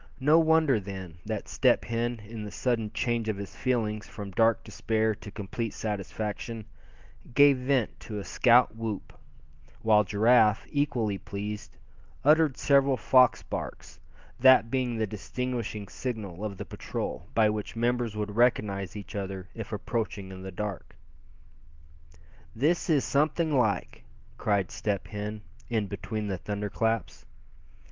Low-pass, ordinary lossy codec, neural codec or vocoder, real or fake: 7.2 kHz; Opus, 32 kbps; none; real